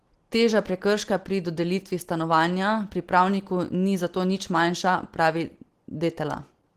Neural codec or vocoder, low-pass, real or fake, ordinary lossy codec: none; 14.4 kHz; real; Opus, 16 kbps